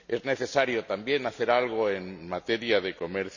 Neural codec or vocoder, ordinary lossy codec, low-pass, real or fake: none; none; 7.2 kHz; real